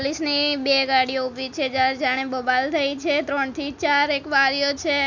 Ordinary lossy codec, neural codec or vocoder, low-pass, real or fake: none; none; 7.2 kHz; real